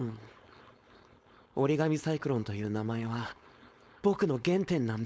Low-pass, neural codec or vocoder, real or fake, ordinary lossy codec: none; codec, 16 kHz, 4.8 kbps, FACodec; fake; none